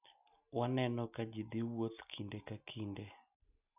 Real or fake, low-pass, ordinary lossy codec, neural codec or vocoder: real; 3.6 kHz; none; none